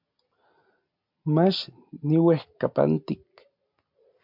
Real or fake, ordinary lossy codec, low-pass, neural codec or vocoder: real; MP3, 48 kbps; 5.4 kHz; none